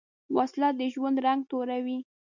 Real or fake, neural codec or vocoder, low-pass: real; none; 7.2 kHz